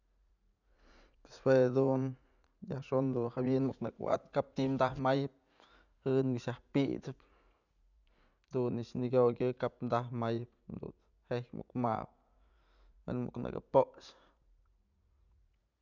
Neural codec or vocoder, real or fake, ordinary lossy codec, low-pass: vocoder, 44.1 kHz, 80 mel bands, Vocos; fake; none; 7.2 kHz